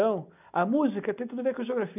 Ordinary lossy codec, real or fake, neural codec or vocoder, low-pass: none; real; none; 3.6 kHz